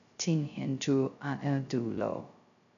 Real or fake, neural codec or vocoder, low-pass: fake; codec, 16 kHz, 0.2 kbps, FocalCodec; 7.2 kHz